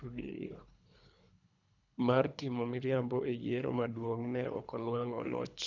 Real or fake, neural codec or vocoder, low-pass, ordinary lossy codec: fake; codec, 24 kHz, 3 kbps, HILCodec; 7.2 kHz; none